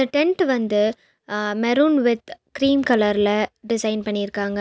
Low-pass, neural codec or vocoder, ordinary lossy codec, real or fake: none; none; none; real